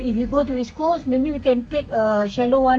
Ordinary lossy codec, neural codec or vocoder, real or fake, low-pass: none; codec, 32 kHz, 1.9 kbps, SNAC; fake; 9.9 kHz